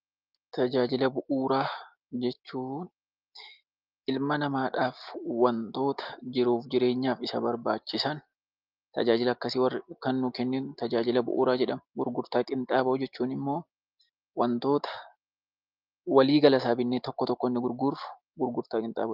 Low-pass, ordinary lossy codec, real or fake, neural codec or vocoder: 5.4 kHz; Opus, 32 kbps; real; none